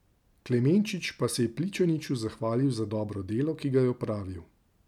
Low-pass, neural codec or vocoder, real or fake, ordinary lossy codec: 19.8 kHz; none; real; none